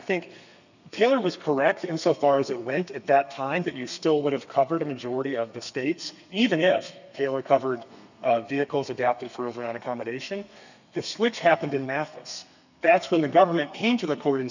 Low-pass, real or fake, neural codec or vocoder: 7.2 kHz; fake; codec, 32 kHz, 1.9 kbps, SNAC